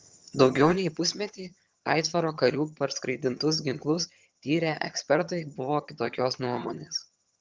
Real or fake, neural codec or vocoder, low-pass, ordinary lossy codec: fake; vocoder, 22.05 kHz, 80 mel bands, HiFi-GAN; 7.2 kHz; Opus, 24 kbps